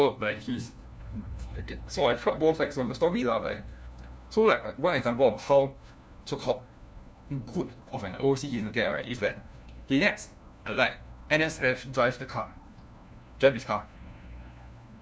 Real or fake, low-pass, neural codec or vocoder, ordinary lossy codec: fake; none; codec, 16 kHz, 1 kbps, FunCodec, trained on LibriTTS, 50 frames a second; none